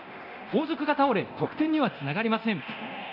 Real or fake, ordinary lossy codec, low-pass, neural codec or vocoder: fake; none; 5.4 kHz; codec, 24 kHz, 0.9 kbps, DualCodec